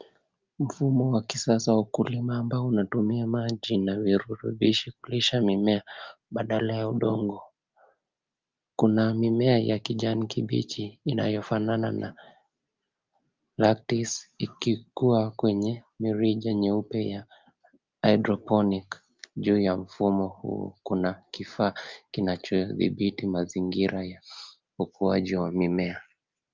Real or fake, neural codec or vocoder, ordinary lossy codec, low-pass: real; none; Opus, 24 kbps; 7.2 kHz